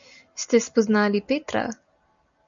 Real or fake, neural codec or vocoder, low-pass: real; none; 7.2 kHz